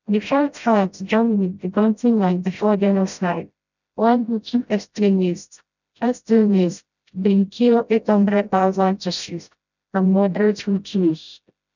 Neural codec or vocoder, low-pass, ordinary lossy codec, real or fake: codec, 16 kHz, 0.5 kbps, FreqCodec, smaller model; 7.2 kHz; none; fake